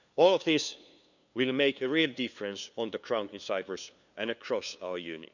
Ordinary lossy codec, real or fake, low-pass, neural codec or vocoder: none; fake; 7.2 kHz; codec, 16 kHz, 2 kbps, FunCodec, trained on LibriTTS, 25 frames a second